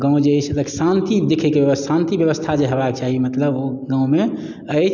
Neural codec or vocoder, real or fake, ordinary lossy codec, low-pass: none; real; none; 7.2 kHz